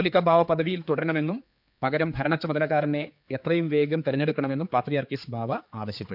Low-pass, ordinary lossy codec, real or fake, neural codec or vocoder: 5.4 kHz; none; fake; codec, 16 kHz, 4 kbps, X-Codec, HuBERT features, trained on general audio